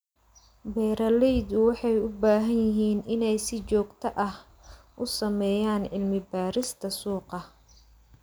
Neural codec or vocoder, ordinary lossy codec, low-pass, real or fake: none; none; none; real